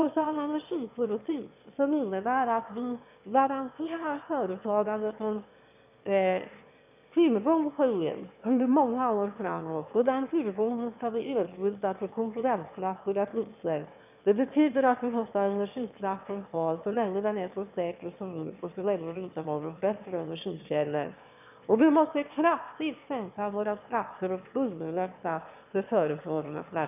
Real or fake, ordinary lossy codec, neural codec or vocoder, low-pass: fake; none; autoencoder, 22.05 kHz, a latent of 192 numbers a frame, VITS, trained on one speaker; 3.6 kHz